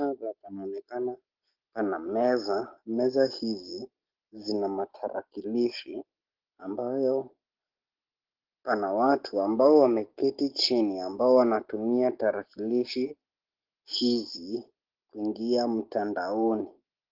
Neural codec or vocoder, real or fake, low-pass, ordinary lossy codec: none; real; 5.4 kHz; Opus, 16 kbps